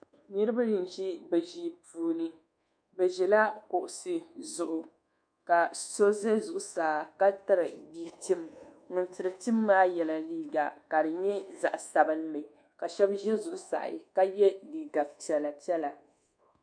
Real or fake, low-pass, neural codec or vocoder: fake; 9.9 kHz; codec, 24 kHz, 1.2 kbps, DualCodec